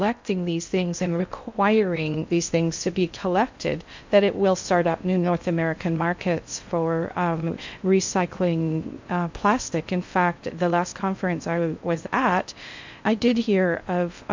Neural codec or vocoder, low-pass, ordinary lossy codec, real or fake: codec, 16 kHz in and 24 kHz out, 0.6 kbps, FocalCodec, streaming, 4096 codes; 7.2 kHz; MP3, 64 kbps; fake